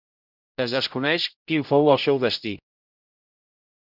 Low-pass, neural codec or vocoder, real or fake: 5.4 kHz; codec, 16 kHz, 0.5 kbps, X-Codec, HuBERT features, trained on general audio; fake